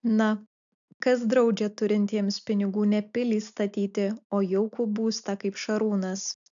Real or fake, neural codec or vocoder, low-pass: real; none; 7.2 kHz